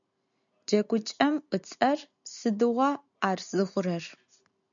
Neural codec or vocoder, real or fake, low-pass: none; real; 7.2 kHz